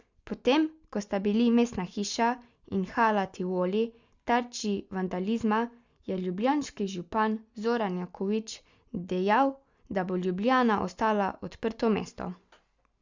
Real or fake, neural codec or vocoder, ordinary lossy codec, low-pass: real; none; Opus, 64 kbps; 7.2 kHz